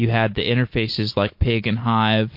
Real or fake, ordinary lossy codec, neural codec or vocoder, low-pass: real; MP3, 32 kbps; none; 5.4 kHz